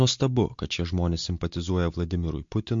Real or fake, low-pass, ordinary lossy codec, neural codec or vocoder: real; 7.2 kHz; MP3, 48 kbps; none